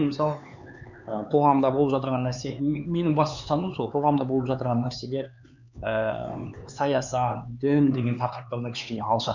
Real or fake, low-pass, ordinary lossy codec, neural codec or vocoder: fake; 7.2 kHz; none; codec, 16 kHz, 4 kbps, X-Codec, HuBERT features, trained on LibriSpeech